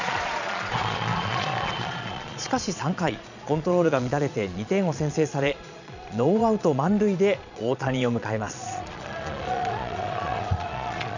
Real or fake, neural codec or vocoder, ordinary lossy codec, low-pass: fake; vocoder, 22.05 kHz, 80 mel bands, Vocos; none; 7.2 kHz